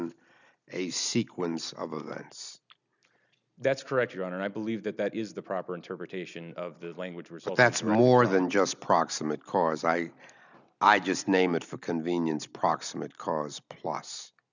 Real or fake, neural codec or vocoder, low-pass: real; none; 7.2 kHz